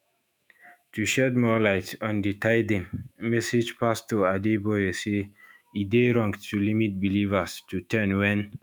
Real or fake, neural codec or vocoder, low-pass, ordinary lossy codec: fake; autoencoder, 48 kHz, 128 numbers a frame, DAC-VAE, trained on Japanese speech; none; none